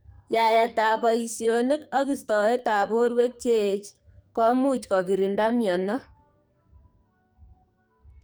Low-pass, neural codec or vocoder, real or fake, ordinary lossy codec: none; codec, 44.1 kHz, 2.6 kbps, SNAC; fake; none